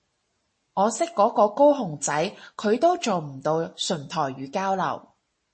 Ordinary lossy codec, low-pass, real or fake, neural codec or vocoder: MP3, 32 kbps; 9.9 kHz; real; none